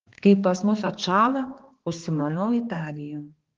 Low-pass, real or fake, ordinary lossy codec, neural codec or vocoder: 7.2 kHz; fake; Opus, 16 kbps; codec, 16 kHz, 2 kbps, X-Codec, HuBERT features, trained on balanced general audio